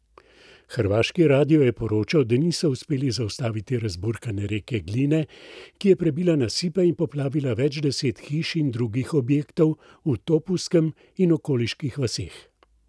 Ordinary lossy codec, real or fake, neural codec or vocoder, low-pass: none; real; none; none